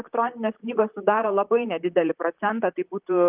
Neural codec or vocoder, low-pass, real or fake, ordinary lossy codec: vocoder, 44.1 kHz, 128 mel bands, Pupu-Vocoder; 3.6 kHz; fake; Opus, 24 kbps